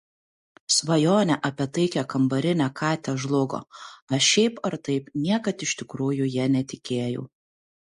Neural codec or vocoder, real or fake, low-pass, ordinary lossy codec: none; real; 14.4 kHz; MP3, 48 kbps